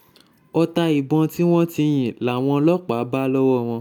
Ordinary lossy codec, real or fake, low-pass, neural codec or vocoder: none; real; 19.8 kHz; none